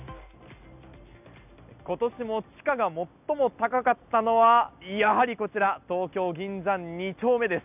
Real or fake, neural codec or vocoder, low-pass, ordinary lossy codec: real; none; 3.6 kHz; none